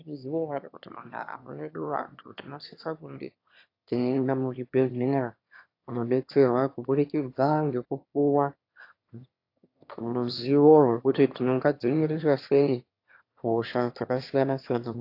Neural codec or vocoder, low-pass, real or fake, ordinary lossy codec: autoencoder, 22.05 kHz, a latent of 192 numbers a frame, VITS, trained on one speaker; 5.4 kHz; fake; AAC, 32 kbps